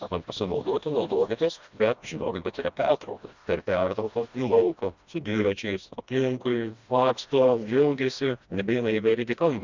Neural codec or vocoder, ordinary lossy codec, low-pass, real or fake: codec, 16 kHz, 1 kbps, FreqCodec, smaller model; Opus, 64 kbps; 7.2 kHz; fake